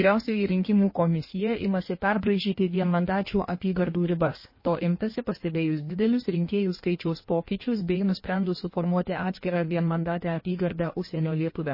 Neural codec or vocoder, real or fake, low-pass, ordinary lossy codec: codec, 16 kHz in and 24 kHz out, 1.1 kbps, FireRedTTS-2 codec; fake; 5.4 kHz; MP3, 24 kbps